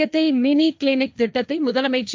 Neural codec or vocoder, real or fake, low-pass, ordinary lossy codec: codec, 16 kHz, 1.1 kbps, Voila-Tokenizer; fake; none; none